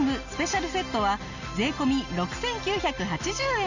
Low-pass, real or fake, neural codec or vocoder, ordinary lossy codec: 7.2 kHz; real; none; none